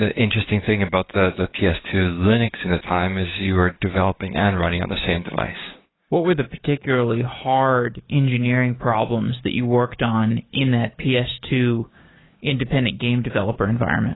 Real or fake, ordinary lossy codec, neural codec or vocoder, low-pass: fake; AAC, 16 kbps; codec, 16 kHz, 6 kbps, DAC; 7.2 kHz